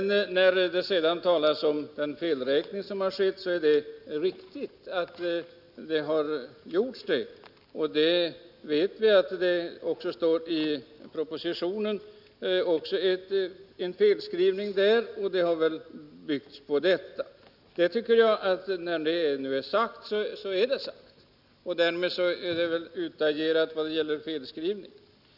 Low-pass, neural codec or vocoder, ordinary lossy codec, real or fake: 5.4 kHz; none; none; real